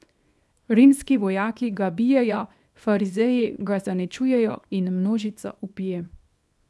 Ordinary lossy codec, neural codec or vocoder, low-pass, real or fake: none; codec, 24 kHz, 0.9 kbps, WavTokenizer, small release; none; fake